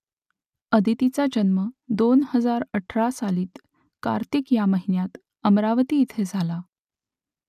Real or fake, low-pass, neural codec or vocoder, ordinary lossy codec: real; 14.4 kHz; none; none